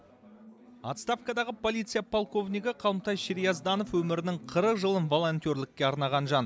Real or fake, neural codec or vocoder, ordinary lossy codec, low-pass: real; none; none; none